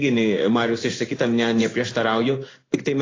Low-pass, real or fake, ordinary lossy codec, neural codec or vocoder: 7.2 kHz; fake; AAC, 32 kbps; codec, 16 kHz in and 24 kHz out, 1 kbps, XY-Tokenizer